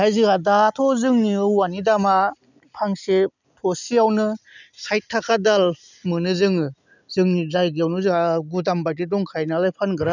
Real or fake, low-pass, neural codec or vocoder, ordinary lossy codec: real; 7.2 kHz; none; none